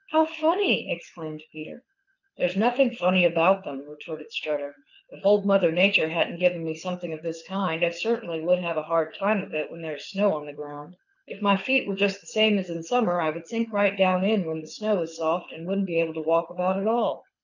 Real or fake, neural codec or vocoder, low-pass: fake; codec, 24 kHz, 6 kbps, HILCodec; 7.2 kHz